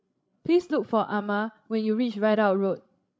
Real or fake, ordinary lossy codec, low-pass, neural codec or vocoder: fake; none; none; codec, 16 kHz, 16 kbps, FreqCodec, larger model